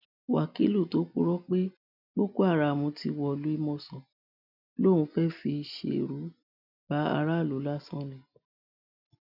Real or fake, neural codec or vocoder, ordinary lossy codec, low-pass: real; none; none; 5.4 kHz